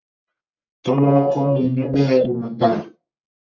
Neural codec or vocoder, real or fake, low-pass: codec, 44.1 kHz, 1.7 kbps, Pupu-Codec; fake; 7.2 kHz